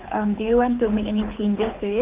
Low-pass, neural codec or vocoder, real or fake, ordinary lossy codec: 3.6 kHz; codec, 24 kHz, 6 kbps, HILCodec; fake; Opus, 32 kbps